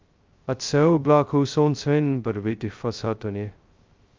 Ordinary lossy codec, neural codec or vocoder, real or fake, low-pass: Opus, 32 kbps; codec, 16 kHz, 0.2 kbps, FocalCodec; fake; 7.2 kHz